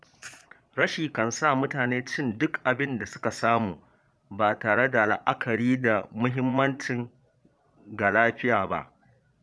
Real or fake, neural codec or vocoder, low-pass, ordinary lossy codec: fake; vocoder, 22.05 kHz, 80 mel bands, Vocos; none; none